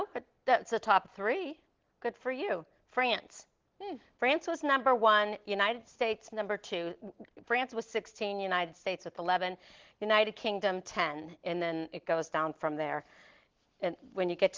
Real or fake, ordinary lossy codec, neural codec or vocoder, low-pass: real; Opus, 16 kbps; none; 7.2 kHz